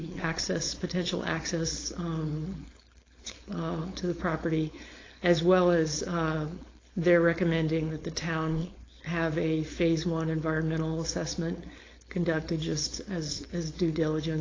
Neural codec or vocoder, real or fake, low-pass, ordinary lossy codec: codec, 16 kHz, 4.8 kbps, FACodec; fake; 7.2 kHz; AAC, 32 kbps